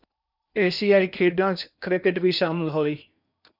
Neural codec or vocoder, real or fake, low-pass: codec, 16 kHz in and 24 kHz out, 0.8 kbps, FocalCodec, streaming, 65536 codes; fake; 5.4 kHz